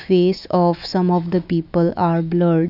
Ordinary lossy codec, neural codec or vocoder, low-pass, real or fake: none; autoencoder, 48 kHz, 128 numbers a frame, DAC-VAE, trained on Japanese speech; 5.4 kHz; fake